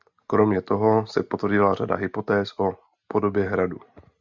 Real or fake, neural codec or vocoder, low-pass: real; none; 7.2 kHz